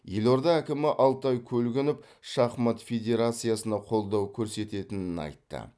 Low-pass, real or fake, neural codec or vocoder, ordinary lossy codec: none; real; none; none